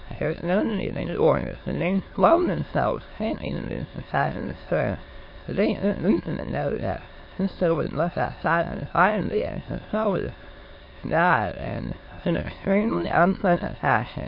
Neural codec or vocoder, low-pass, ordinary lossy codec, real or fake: autoencoder, 22.05 kHz, a latent of 192 numbers a frame, VITS, trained on many speakers; 5.4 kHz; MP3, 32 kbps; fake